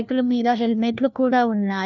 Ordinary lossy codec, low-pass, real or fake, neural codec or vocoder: none; 7.2 kHz; fake; codec, 16 kHz, 1 kbps, FunCodec, trained on LibriTTS, 50 frames a second